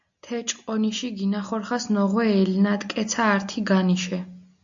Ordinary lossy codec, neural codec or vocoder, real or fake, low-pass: AAC, 64 kbps; none; real; 7.2 kHz